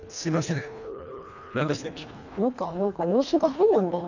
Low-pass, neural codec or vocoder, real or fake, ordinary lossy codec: 7.2 kHz; codec, 24 kHz, 1.5 kbps, HILCodec; fake; none